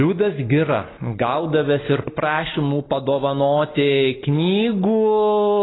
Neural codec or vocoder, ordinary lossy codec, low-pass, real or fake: none; AAC, 16 kbps; 7.2 kHz; real